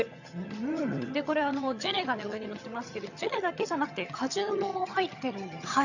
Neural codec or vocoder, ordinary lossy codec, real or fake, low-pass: vocoder, 22.05 kHz, 80 mel bands, HiFi-GAN; none; fake; 7.2 kHz